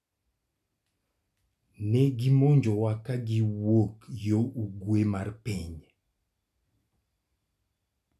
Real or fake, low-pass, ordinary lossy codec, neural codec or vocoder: real; 14.4 kHz; none; none